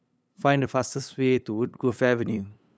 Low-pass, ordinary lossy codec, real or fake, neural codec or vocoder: none; none; fake; codec, 16 kHz, 8 kbps, FunCodec, trained on LibriTTS, 25 frames a second